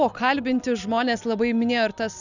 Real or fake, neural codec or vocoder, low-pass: real; none; 7.2 kHz